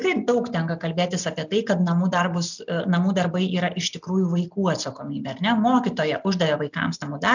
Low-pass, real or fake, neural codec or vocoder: 7.2 kHz; real; none